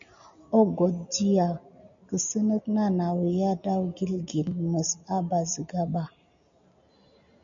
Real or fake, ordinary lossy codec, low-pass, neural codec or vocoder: real; MP3, 96 kbps; 7.2 kHz; none